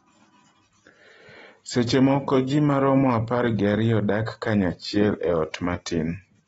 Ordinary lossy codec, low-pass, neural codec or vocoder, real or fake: AAC, 24 kbps; 10.8 kHz; none; real